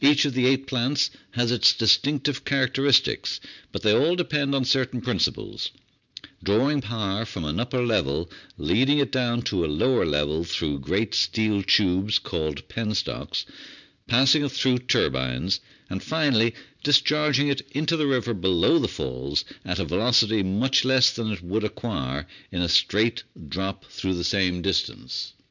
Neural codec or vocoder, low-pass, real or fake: none; 7.2 kHz; real